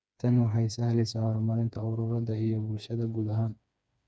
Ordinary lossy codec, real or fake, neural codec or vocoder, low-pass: none; fake; codec, 16 kHz, 4 kbps, FreqCodec, smaller model; none